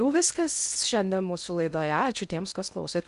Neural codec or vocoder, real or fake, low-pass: codec, 16 kHz in and 24 kHz out, 0.6 kbps, FocalCodec, streaming, 2048 codes; fake; 10.8 kHz